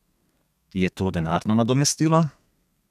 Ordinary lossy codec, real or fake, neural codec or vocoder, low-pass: none; fake; codec, 32 kHz, 1.9 kbps, SNAC; 14.4 kHz